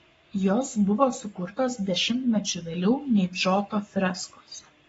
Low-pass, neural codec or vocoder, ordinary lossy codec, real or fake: 19.8 kHz; codec, 44.1 kHz, 7.8 kbps, Pupu-Codec; AAC, 24 kbps; fake